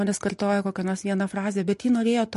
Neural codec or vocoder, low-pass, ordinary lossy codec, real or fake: none; 14.4 kHz; MP3, 48 kbps; real